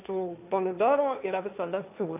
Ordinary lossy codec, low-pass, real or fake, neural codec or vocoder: AAC, 32 kbps; 3.6 kHz; fake; codec, 16 kHz, 1.1 kbps, Voila-Tokenizer